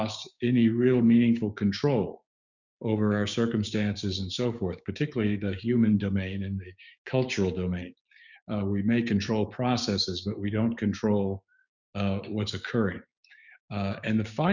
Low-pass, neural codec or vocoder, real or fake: 7.2 kHz; codec, 16 kHz, 6 kbps, DAC; fake